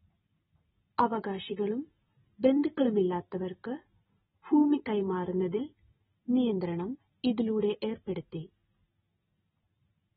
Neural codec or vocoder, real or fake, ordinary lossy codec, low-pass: none; real; AAC, 16 kbps; 7.2 kHz